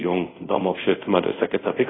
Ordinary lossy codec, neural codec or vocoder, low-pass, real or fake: AAC, 16 kbps; codec, 24 kHz, 0.5 kbps, DualCodec; 7.2 kHz; fake